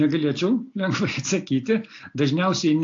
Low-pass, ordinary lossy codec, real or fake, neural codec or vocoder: 7.2 kHz; AAC, 48 kbps; real; none